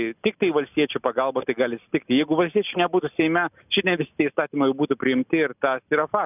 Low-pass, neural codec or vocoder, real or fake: 3.6 kHz; none; real